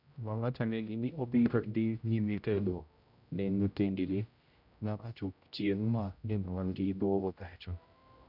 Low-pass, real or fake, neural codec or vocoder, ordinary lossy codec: 5.4 kHz; fake; codec, 16 kHz, 0.5 kbps, X-Codec, HuBERT features, trained on general audio; none